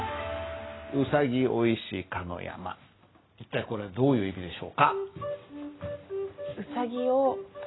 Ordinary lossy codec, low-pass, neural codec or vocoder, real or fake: AAC, 16 kbps; 7.2 kHz; none; real